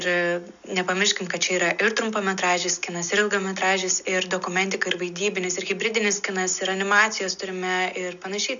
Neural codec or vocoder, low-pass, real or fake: none; 7.2 kHz; real